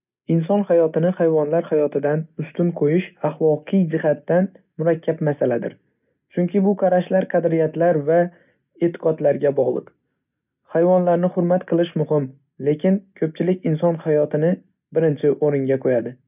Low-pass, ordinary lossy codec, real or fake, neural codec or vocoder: 3.6 kHz; none; real; none